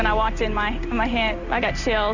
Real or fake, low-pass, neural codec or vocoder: real; 7.2 kHz; none